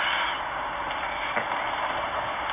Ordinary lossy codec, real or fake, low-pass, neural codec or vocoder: none; real; 3.6 kHz; none